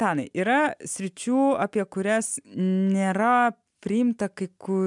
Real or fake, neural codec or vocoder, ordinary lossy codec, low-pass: real; none; MP3, 96 kbps; 10.8 kHz